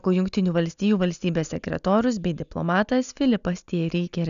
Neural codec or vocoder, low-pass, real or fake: none; 7.2 kHz; real